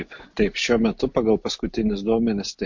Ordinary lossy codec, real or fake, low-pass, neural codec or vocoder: MP3, 48 kbps; real; 7.2 kHz; none